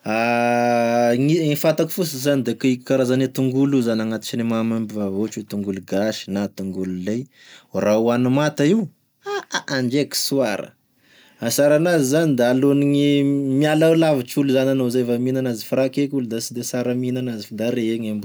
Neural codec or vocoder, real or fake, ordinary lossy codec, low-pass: none; real; none; none